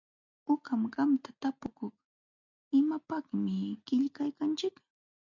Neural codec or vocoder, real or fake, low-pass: none; real; 7.2 kHz